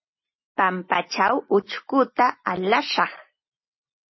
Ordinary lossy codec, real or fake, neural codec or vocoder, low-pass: MP3, 24 kbps; real; none; 7.2 kHz